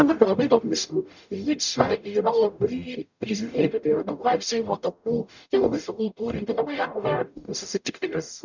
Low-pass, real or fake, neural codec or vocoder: 7.2 kHz; fake; codec, 44.1 kHz, 0.9 kbps, DAC